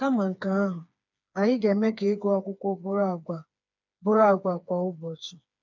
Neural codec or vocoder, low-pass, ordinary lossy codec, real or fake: codec, 16 kHz, 4 kbps, FreqCodec, smaller model; 7.2 kHz; none; fake